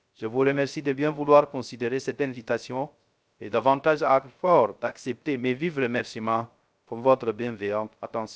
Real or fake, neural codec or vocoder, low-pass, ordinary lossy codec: fake; codec, 16 kHz, 0.3 kbps, FocalCodec; none; none